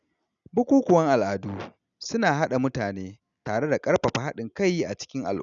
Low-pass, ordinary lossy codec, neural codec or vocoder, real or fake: 7.2 kHz; none; none; real